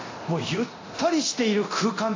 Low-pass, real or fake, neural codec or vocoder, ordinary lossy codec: 7.2 kHz; fake; codec, 24 kHz, 0.9 kbps, DualCodec; AAC, 32 kbps